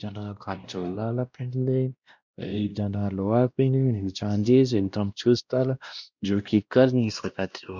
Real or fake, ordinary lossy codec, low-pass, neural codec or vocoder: fake; none; 7.2 kHz; codec, 16 kHz, 1 kbps, X-Codec, WavLM features, trained on Multilingual LibriSpeech